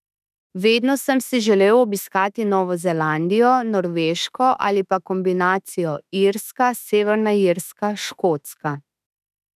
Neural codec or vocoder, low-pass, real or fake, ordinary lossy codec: autoencoder, 48 kHz, 32 numbers a frame, DAC-VAE, trained on Japanese speech; 14.4 kHz; fake; none